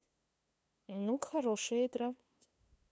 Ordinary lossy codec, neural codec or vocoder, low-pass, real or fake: none; codec, 16 kHz, 2 kbps, FunCodec, trained on LibriTTS, 25 frames a second; none; fake